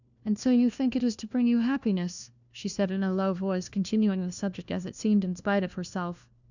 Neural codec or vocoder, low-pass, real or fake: codec, 16 kHz, 1 kbps, FunCodec, trained on LibriTTS, 50 frames a second; 7.2 kHz; fake